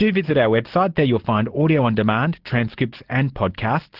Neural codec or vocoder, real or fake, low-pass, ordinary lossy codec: none; real; 5.4 kHz; Opus, 16 kbps